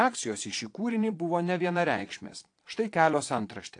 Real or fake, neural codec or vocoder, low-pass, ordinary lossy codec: fake; vocoder, 22.05 kHz, 80 mel bands, Vocos; 9.9 kHz; AAC, 48 kbps